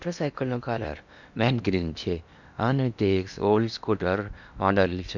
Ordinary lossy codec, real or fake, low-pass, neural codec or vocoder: none; fake; 7.2 kHz; codec, 16 kHz in and 24 kHz out, 0.8 kbps, FocalCodec, streaming, 65536 codes